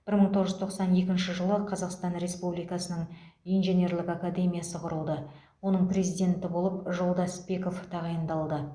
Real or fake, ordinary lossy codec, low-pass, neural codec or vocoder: real; Opus, 64 kbps; 9.9 kHz; none